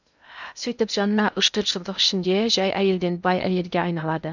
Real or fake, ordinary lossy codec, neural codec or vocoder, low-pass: fake; none; codec, 16 kHz in and 24 kHz out, 0.6 kbps, FocalCodec, streaming, 2048 codes; 7.2 kHz